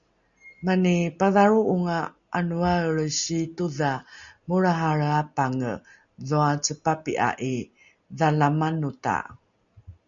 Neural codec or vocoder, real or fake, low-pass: none; real; 7.2 kHz